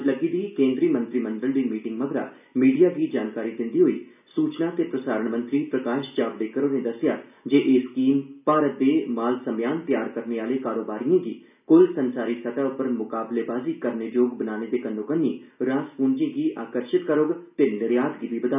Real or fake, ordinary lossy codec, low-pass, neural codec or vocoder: real; none; 3.6 kHz; none